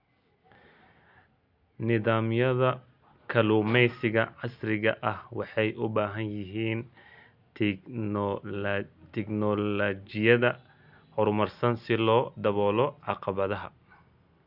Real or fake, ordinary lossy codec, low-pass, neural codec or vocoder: real; none; 5.4 kHz; none